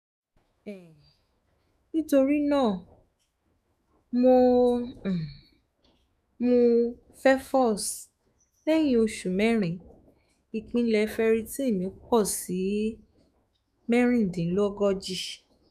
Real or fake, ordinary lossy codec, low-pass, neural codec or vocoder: fake; none; 14.4 kHz; autoencoder, 48 kHz, 128 numbers a frame, DAC-VAE, trained on Japanese speech